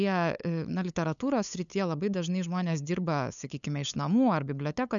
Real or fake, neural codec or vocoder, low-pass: fake; codec, 16 kHz, 8 kbps, FunCodec, trained on LibriTTS, 25 frames a second; 7.2 kHz